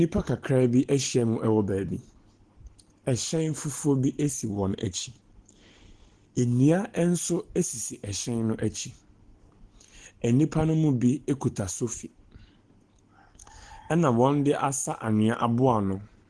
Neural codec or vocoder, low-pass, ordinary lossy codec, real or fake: none; 9.9 kHz; Opus, 16 kbps; real